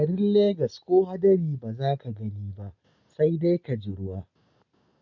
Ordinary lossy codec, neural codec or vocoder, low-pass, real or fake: none; none; 7.2 kHz; real